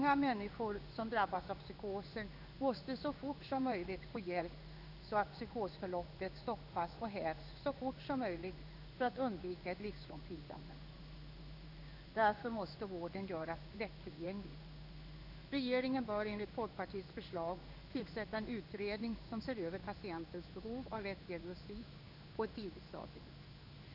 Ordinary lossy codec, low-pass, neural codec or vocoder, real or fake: none; 5.4 kHz; codec, 16 kHz in and 24 kHz out, 1 kbps, XY-Tokenizer; fake